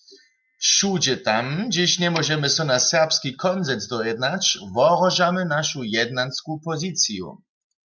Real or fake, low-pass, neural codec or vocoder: real; 7.2 kHz; none